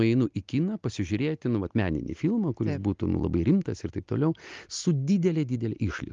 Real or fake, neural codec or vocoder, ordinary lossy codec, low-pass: real; none; Opus, 32 kbps; 7.2 kHz